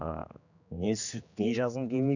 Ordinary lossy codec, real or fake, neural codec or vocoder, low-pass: none; fake; codec, 16 kHz, 2 kbps, X-Codec, HuBERT features, trained on general audio; 7.2 kHz